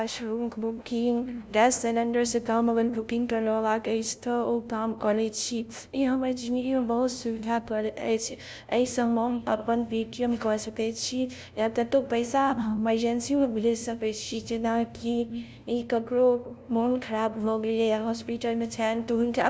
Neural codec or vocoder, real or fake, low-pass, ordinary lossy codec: codec, 16 kHz, 0.5 kbps, FunCodec, trained on LibriTTS, 25 frames a second; fake; none; none